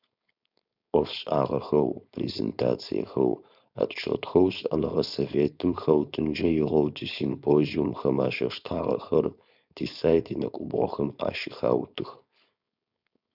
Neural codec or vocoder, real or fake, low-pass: codec, 16 kHz, 4.8 kbps, FACodec; fake; 5.4 kHz